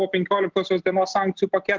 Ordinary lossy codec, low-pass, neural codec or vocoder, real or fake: Opus, 16 kbps; 7.2 kHz; none; real